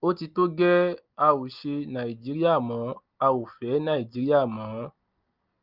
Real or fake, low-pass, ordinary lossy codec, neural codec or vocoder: real; 5.4 kHz; Opus, 32 kbps; none